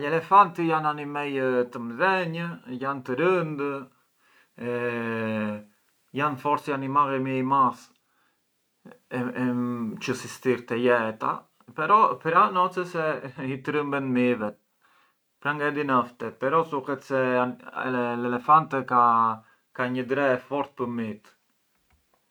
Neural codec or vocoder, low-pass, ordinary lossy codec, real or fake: none; none; none; real